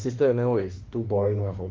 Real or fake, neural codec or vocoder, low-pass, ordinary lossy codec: fake; autoencoder, 48 kHz, 32 numbers a frame, DAC-VAE, trained on Japanese speech; 7.2 kHz; Opus, 16 kbps